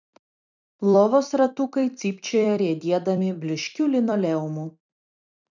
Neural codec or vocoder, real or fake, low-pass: vocoder, 44.1 kHz, 128 mel bands every 256 samples, BigVGAN v2; fake; 7.2 kHz